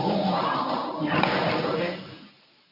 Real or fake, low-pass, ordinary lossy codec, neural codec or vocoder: fake; 5.4 kHz; none; codec, 24 kHz, 0.9 kbps, WavTokenizer, medium speech release version 1